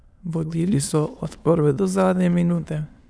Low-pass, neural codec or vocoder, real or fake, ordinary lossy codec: none; autoencoder, 22.05 kHz, a latent of 192 numbers a frame, VITS, trained on many speakers; fake; none